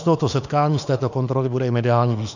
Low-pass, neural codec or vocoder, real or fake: 7.2 kHz; autoencoder, 48 kHz, 32 numbers a frame, DAC-VAE, trained on Japanese speech; fake